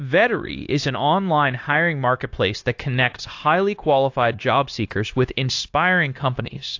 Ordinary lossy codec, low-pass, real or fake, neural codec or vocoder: AAC, 48 kbps; 7.2 kHz; fake; codec, 16 kHz, 0.9 kbps, LongCat-Audio-Codec